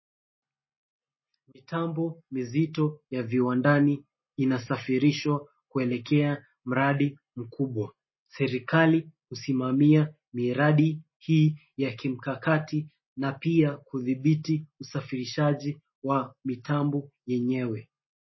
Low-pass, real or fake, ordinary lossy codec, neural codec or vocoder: 7.2 kHz; real; MP3, 24 kbps; none